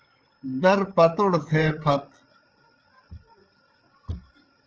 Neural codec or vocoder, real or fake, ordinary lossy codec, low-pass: codec, 16 kHz, 8 kbps, FreqCodec, larger model; fake; Opus, 16 kbps; 7.2 kHz